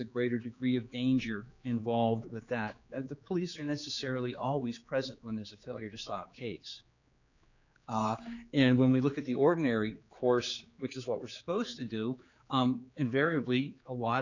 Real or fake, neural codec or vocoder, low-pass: fake; codec, 16 kHz, 4 kbps, X-Codec, HuBERT features, trained on general audio; 7.2 kHz